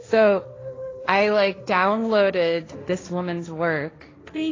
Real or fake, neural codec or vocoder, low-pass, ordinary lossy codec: fake; codec, 16 kHz, 1.1 kbps, Voila-Tokenizer; 7.2 kHz; AAC, 32 kbps